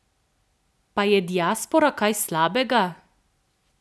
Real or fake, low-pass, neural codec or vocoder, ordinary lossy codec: real; none; none; none